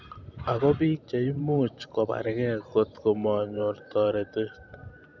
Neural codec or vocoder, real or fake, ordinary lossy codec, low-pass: vocoder, 44.1 kHz, 128 mel bands every 512 samples, BigVGAN v2; fake; none; 7.2 kHz